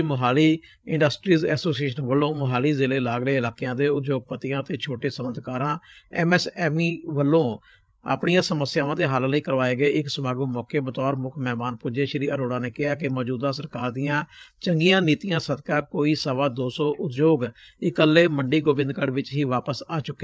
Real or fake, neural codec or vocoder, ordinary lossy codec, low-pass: fake; codec, 16 kHz, 8 kbps, FreqCodec, larger model; none; none